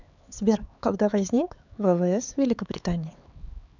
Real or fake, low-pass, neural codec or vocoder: fake; 7.2 kHz; codec, 16 kHz, 4 kbps, X-Codec, HuBERT features, trained on LibriSpeech